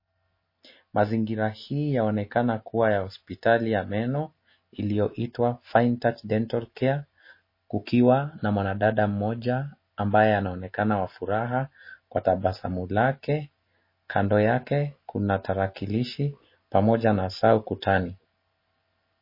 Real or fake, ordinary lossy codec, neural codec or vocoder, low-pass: real; MP3, 24 kbps; none; 5.4 kHz